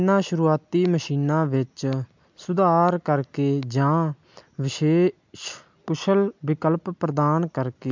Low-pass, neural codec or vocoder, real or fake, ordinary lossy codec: 7.2 kHz; none; real; MP3, 64 kbps